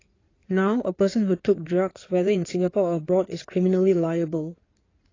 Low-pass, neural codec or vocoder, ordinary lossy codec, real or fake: 7.2 kHz; codec, 16 kHz in and 24 kHz out, 2.2 kbps, FireRedTTS-2 codec; AAC, 32 kbps; fake